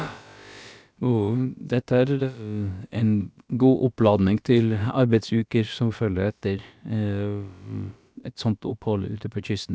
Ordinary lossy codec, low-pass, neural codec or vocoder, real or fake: none; none; codec, 16 kHz, about 1 kbps, DyCAST, with the encoder's durations; fake